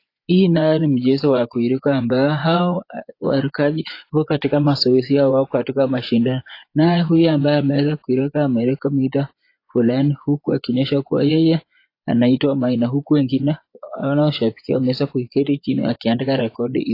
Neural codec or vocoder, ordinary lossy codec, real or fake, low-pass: vocoder, 24 kHz, 100 mel bands, Vocos; AAC, 32 kbps; fake; 5.4 kHz